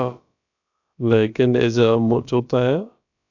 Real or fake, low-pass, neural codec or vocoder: fake; 7.2 kHz; codec, 16 kHz, about 1 kbps, DyCAST, with the encoder's durations